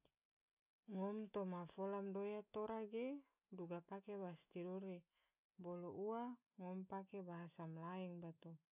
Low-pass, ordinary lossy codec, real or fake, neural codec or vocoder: 3.6 kHz; none; real; none